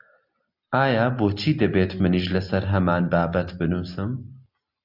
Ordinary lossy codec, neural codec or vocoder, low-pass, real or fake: AAC, 48 kbps; none; 5.4 kHz; real